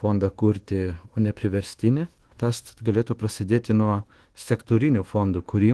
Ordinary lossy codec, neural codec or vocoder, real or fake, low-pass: Opus, 16 kbps; codec, 24 kHz, 1.2 kbps, DualCodec; fake; 10.8 kHz